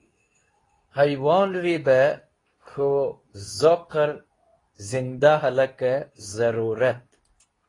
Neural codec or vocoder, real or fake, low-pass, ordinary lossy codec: codec, 24 kHz, 0.9 kbps, WavTokenizer, medium speech release version 2; fake; 10.8 kHz; AAC, 32 kbps